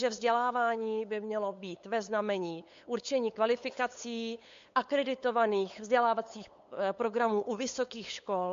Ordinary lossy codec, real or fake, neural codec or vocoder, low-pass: MP3, 48 kbps; fake; codec, 16 kHz, 8 kbps, FunCodec, trained on LibriTTS, 25 frames a second; 7.2 kHz